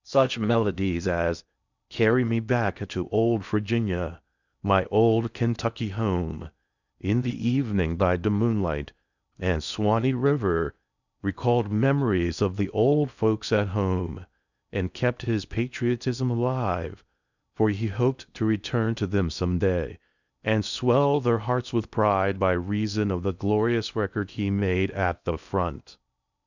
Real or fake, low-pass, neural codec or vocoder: fake; 7.2 kHz; codec, 16 kHz in and 24 kHz out, 0.6 kbps, FocalCodec, streaming, 4096 codes